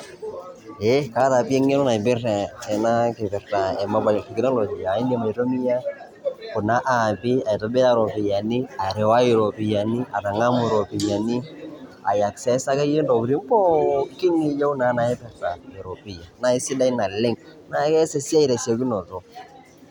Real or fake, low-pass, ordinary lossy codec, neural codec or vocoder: real; 19.8 kHz; none; none